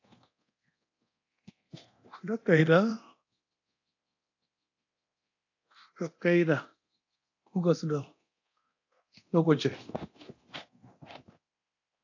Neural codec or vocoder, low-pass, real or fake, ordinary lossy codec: codec, 24 kHz, 0.9 kbps, DualCodec; 7.2 kHz; fake; AAC, 48 kbps